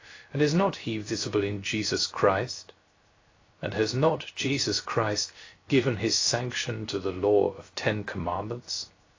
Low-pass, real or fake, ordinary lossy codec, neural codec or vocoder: 7.2 kHz; fake; AAC, 32 kbps; codec, 16 kHz, 0.3 kbps, FocalCodec